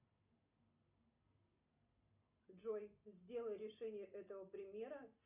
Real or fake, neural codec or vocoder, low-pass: real; none; 3.6 kHz